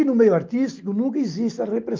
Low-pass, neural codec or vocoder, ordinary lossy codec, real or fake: 7.2 kHz; none; Opus, 32 kbps; real